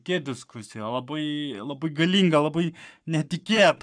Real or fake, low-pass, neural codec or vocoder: fake; 9.9 kHz; codec, 44.1 kHz, 7.8 kbps, Pupu-Codec